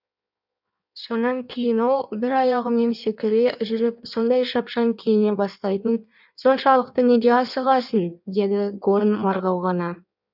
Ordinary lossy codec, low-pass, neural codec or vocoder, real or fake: none; 5.4 kHz; codec, 16 kHz in and 24 kHz out, 1.1 kbps, FireRedTTS-2 codec; fake